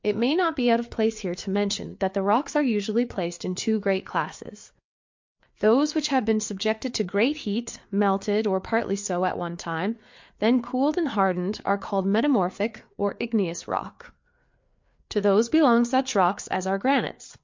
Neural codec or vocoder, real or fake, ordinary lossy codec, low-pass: codec, 16 kHz, 4 kbps, FreqCodec, larger model; fake; MP3, 48 kbps; 7.2 kHz